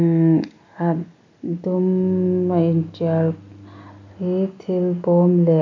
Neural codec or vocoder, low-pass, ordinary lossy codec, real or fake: none; 7.2 kHz; MP3, 48 kbps; real